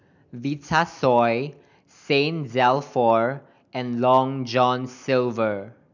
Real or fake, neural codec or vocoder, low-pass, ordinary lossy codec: real; none; 7.2 kHz; none